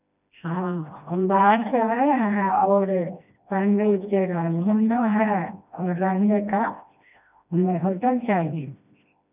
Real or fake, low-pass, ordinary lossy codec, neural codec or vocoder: fake; 3.6 kHz; none; codec, 16 kHz, 1 kbps, FreqCodec, smaller model